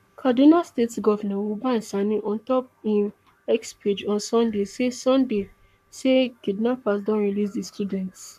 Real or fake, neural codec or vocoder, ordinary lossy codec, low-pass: fake; codec, 44.1 kHz, 7.8 kbps, Pupu-Codec; none; 14.4 kHz